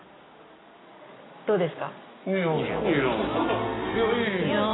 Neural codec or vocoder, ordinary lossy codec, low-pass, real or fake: none; AAC, 16 kbps; 7.2 kHz; real